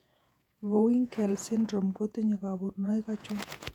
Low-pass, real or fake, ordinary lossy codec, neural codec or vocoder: 19.8 kHz; fake; none; vocoder, 48 kHz, 128 mel bands, Vocos